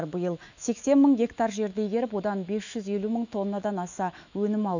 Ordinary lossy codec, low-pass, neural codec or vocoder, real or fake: none; 7.2 kHz; autoencoder, 48 kHz, 128 numbers a frame, DAC-VAE, trained on Japanese speech; fake